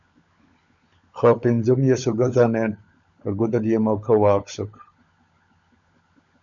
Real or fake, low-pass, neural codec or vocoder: fake; 7.2 kHz; codec, 16 kHz, 16 kbps, FunCodec, trained on LibriTTS, 50 frames a second